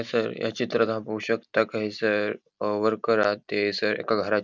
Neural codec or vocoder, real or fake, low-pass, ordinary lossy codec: none; real; 7.2 kHz; none